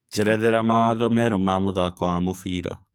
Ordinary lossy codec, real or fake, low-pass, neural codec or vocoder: none; fake; none; codec, 44.1 kHz, 2.6 kbps, SNAC